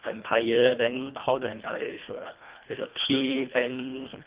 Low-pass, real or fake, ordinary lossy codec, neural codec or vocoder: 3.6 kHz; fake; Opus, 32 kbps; codec, 24 kHz, 1.5 kbps, HILCodec